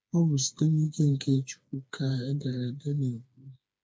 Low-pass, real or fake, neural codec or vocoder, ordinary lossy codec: none; fake; codec, 16 kHz, 4 kbps, FreqCodec, smaller model; none